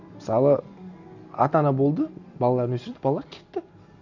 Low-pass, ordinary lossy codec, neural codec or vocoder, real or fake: 7.2 kHz; none; none; real